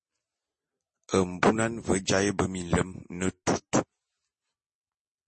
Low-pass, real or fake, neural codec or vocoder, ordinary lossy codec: 9.9 kHz; real; none; MP3, 32 kbps